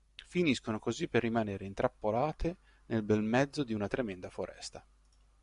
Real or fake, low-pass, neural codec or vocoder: real; 10.8 kHz; none